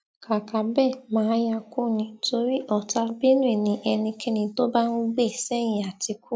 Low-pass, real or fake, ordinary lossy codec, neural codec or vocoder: none; real; none; none